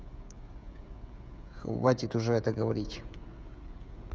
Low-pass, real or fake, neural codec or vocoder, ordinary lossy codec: none; fake; codec, 16 kHz, 16 kbps, FreqCodec, smaller model; none